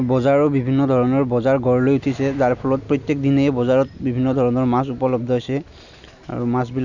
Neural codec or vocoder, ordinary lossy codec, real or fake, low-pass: none; none; real; 7.2 kHz